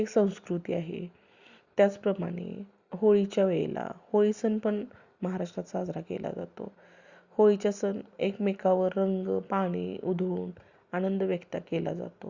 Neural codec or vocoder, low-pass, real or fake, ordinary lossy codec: none; 7.2 kHz; real; Opus, 64 kbps